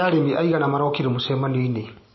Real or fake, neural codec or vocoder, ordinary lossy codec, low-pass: real; none; MP3, 24 kbps; 7.2 kHz